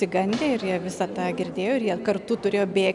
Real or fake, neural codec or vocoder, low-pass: real; none; 10.8 kHz